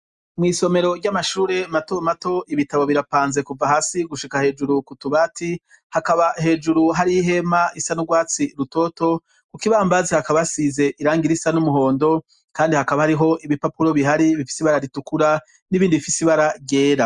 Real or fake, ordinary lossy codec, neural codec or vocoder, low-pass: real; Opus, 64 kbps; none; 10.8 kHz